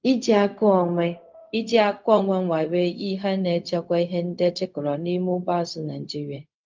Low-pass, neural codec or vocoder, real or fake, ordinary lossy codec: 7.2 kHz; codec, 16 kHz, 0.4 kbps, LongCat-Audio-Codec; fake; Opus, 24 kbps